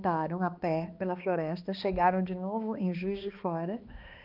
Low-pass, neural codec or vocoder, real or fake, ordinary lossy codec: 5.4 kHz; codec, 16 kHz, 2 kbps, X-Codec, HuBERT features, trained on balanced general audio; fake; Opus, 24 kbps